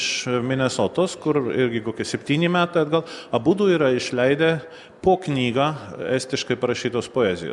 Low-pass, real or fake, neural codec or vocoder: 10.8 kHz; real; none